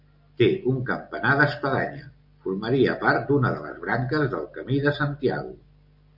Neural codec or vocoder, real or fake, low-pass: none; real; 5.4 kHz